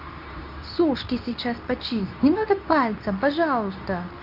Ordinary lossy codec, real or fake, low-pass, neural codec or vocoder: none; fake; 5.4 kHz; codec, 24 kHz, 0.9 kbps, WavTokenizer, medium speech release version 2